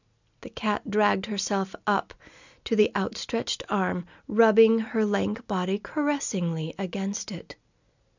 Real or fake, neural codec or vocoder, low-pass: real; none; 7.2 kHz